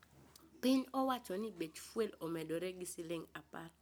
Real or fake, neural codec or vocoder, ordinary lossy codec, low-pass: real; none; none; none